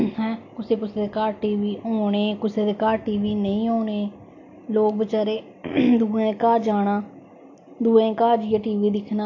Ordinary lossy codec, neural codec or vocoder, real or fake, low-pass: AAC, 48 kbps; none; real; 7.2 kHz